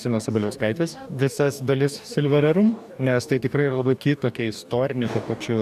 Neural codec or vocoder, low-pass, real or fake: codec, 44.1 kHz, 2.6 kbps, DAC; 14.4 kHz; fake